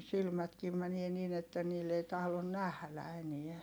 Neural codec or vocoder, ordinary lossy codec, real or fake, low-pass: none; none; real; none